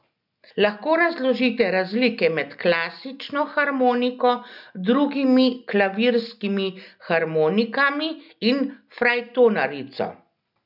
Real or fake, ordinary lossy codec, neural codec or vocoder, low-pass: real; none; none; 5.4 kHz